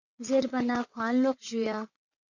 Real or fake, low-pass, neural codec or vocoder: fake; 7.2 kHz; vocoder, 22.05 kHz, 80 mel bands, WaveNeXt